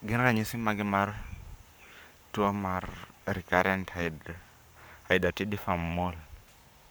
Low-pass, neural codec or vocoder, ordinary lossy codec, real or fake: none; codec, 44.1 kHz, 7.8 kbps, Pupu-Codec; none; fake